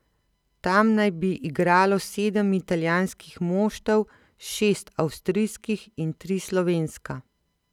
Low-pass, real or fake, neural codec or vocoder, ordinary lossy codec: 19.8 kHz; real; none; none